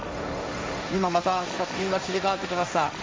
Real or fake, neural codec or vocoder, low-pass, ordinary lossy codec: fake; codec, 16 kHz, 1.1 kbps, Voila-Tokenizer; none; none